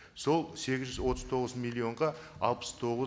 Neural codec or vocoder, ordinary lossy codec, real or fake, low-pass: none; none; real; none